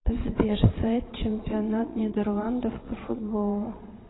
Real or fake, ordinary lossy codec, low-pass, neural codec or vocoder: fake; AAC, 16 kbps; 7.2 kHz; vocoder, 22.05 kHz, 80 mel bands, Vocos